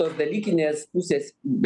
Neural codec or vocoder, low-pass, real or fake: none; 10.8 kHz; real